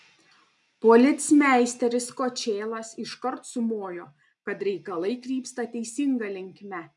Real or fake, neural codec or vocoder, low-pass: real; none; 10.8 kHz